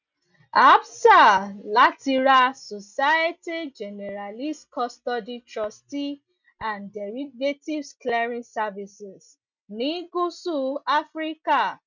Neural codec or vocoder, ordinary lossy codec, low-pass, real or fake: none; none; 7.2 kHz; real